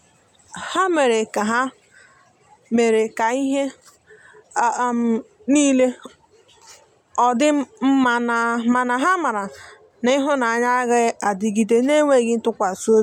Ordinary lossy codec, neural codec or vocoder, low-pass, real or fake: MP3, 96 kbps; none; 19.8 kHz; real